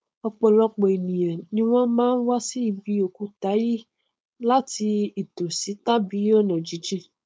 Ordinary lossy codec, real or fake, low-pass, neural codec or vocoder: none; fake; none; codec, 16 kHz, 4.8 kbps, FACodec